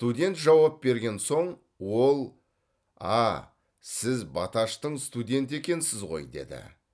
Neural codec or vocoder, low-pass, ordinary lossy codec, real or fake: none; none; none; real